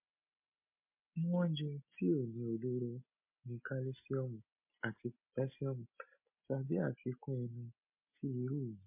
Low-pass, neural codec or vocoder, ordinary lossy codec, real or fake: 3.6 kHz; none; MP3, 24 kbps; real